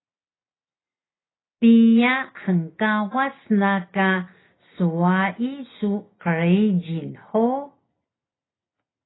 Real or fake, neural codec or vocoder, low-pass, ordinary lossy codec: real; none; 7.2 kHz; AAC, 16 kbps